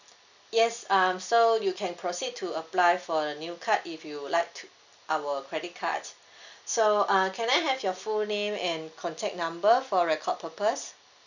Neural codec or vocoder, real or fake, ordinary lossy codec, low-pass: none; real; none; 7.2 kHz